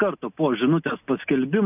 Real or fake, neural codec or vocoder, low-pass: real; none; 3.6 kHz